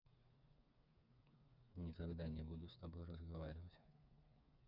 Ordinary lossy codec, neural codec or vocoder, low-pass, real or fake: none; codec, 16 kHz, 4 kbps, FreqCodec, smaller model; 5.4 kHz; fake